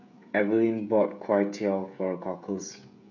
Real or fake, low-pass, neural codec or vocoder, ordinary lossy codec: fake; 7.2 kHz; codec, 16 kHz, 16 kbps, FreqCodec, smaller model; none